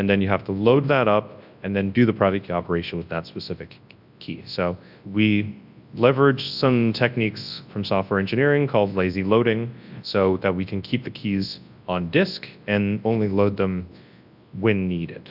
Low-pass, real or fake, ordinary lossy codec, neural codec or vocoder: 5.4 kHz; fake; AAC, 48 kbps; codec, 24 kHz, 0.9 kbps, WavTokenizer, large speech release